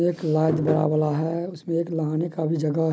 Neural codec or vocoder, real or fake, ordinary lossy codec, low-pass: none; real; none; none